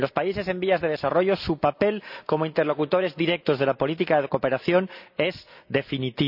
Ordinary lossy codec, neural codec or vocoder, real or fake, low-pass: none; none; real; 5.4 kHz